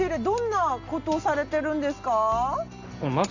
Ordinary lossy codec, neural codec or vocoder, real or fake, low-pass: none; none; real; 7.2 kHz